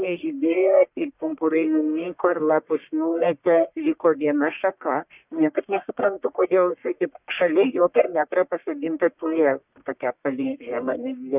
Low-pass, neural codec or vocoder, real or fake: 3.6 kHz; codec, 44.1 kHz, 1.7 kbps, Pupu-Codec; fake